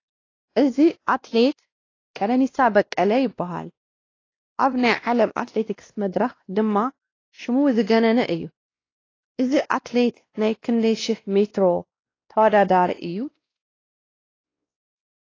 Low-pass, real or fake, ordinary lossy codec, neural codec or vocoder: 7.2 kHz; fake; AAC, 32 kbps; codec, 16 kHz, 1 kbps, X-Codec, WavLM features, trained on Multilingual LibriSpeech